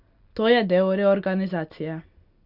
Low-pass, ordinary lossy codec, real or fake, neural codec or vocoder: 5.4 kHz; Opus, 64 kbps; real; none